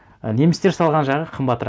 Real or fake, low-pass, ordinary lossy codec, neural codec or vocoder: real; none; none; none